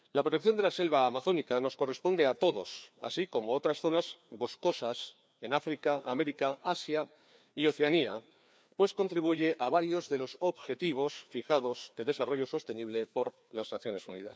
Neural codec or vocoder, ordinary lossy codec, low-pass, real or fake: codec, 16 kHz, 2 kbps, FreqCodec, larger model; none; none; fake